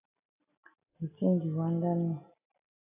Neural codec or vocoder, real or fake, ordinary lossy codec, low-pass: none; real; AAC, 24 kbps; 3.6 kHz